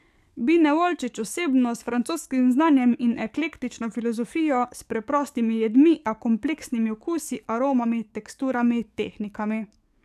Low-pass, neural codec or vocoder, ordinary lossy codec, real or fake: 14.4 kHz; codec, 44.1 kHz, 7.8 kbps, DAC; none; fake